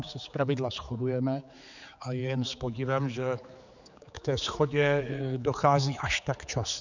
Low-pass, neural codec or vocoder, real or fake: 7.2 kHz; codec, 16 kHz, 4 kbps, X-Codec, HuBERT features, trained on general audio; fake